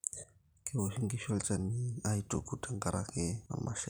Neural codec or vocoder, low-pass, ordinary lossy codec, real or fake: none; none; none; real